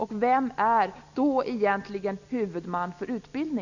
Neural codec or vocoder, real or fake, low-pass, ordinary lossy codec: none; real; 7.2 kHz; none